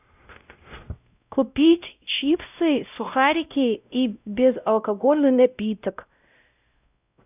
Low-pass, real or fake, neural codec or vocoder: 3.6 kHz; fake; codec, 16 kHz, 0.5 kbps, X-Codec, WavLM features, trained on Multilingual LibriSpeech